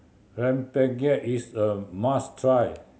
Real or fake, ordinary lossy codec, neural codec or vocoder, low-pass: real; none; none; none